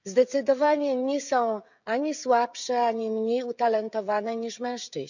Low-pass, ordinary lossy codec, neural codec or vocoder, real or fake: 7.2 kHz; none; codec, 16 kHz, 16 kbps, FreqCodec, smaller model; fake